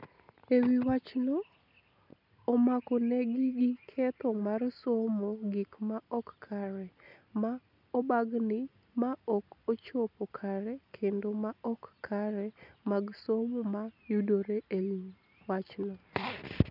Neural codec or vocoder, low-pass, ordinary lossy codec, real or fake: none; 5.4 kHz; none; real